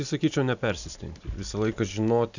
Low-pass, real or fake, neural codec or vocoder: 7.2 kHz; real; none